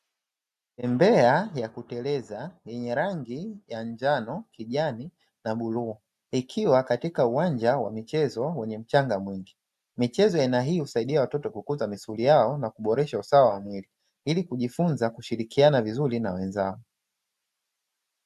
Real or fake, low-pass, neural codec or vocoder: real; 14.4 kHz; none